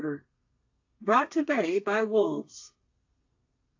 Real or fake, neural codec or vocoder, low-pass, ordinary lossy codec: fake; codec, 32 kHz, 1.9 kbps, SNAC; 7.2 kHz; AAC, 48 kbps